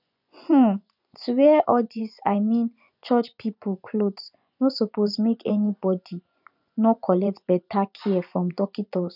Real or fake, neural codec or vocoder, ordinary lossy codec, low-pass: fake; vocoder, 24 kHz, 100 mel bands, Vocos; none; 5.4 kHz